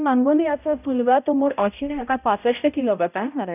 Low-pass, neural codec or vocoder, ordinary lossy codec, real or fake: 3.6 kHz; codec, 16 kHz, 0.5 kbps, X-Codec, HuBERT features, trained on balanced general audio; none; fake